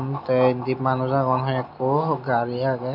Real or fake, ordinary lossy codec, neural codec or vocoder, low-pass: real; none; none; 5.4 kHz